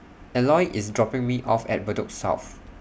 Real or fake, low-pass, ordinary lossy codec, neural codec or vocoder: real; none; none; none